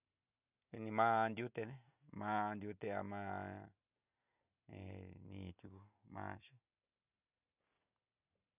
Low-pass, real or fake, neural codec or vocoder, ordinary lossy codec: 3.6 kHz; real; none; none